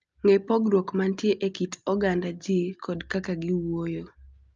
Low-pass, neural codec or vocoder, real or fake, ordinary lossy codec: 7.2 kHz; none; real; Opus, 24 kbps